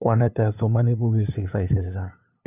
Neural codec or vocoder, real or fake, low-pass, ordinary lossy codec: codec, 16 kHz, 2 kbps, FunCodec, trained on LibriTTS, 25 frames a second; fake; 3.6 kHz; none